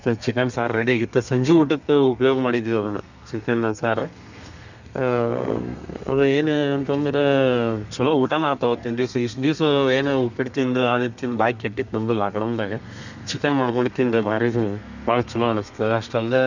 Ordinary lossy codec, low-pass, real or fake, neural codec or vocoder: none; 7.2 kHz; fake; codec, 32 kHz, 1.9 kbps, SNAC